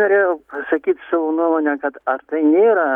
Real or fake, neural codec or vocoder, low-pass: real; none; 19.8 kHz